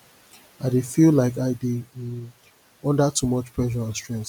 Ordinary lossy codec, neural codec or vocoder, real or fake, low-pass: none; none; real; none